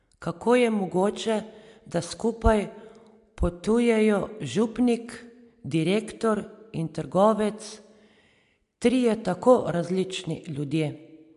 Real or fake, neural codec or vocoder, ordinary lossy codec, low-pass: real; none; MP3, 64 kbps; 10.8 kHz